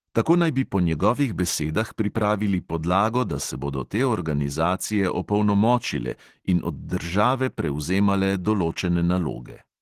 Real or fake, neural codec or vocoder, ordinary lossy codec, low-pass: real; none; Opus, 16 kbps; 14.4 kHz